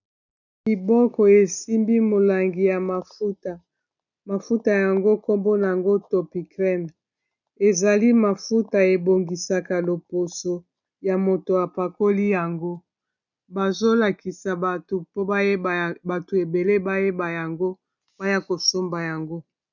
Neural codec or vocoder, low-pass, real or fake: none; 7.2 kHz; real